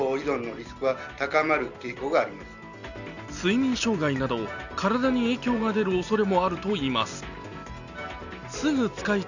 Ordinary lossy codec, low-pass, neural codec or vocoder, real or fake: none; 7.2 kHz; none; real